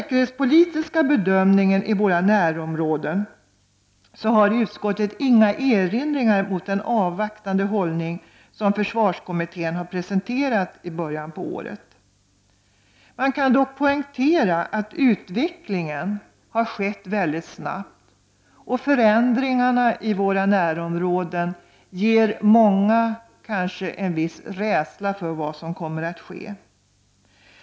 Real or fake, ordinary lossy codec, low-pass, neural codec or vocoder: real; none; none; none